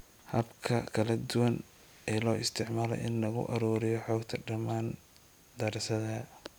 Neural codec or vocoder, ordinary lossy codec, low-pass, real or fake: none; none; none; real